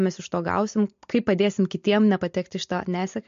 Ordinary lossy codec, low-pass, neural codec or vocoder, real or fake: MP3, 48 kbps; 7.2 kHz; none; real